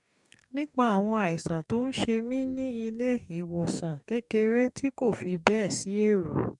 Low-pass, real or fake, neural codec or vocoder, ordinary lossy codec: 10.8 kHz; fake; codec, 44.1 kHz, 2.6 kbps, DAC; none